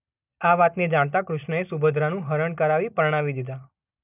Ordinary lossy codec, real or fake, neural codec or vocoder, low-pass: none; real; none; 3.6 kHz